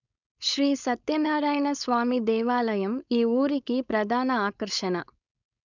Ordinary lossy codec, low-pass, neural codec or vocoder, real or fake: none; 7.2 kHz; codec, 16 kHz, 4.8 kbps, FACodec; fake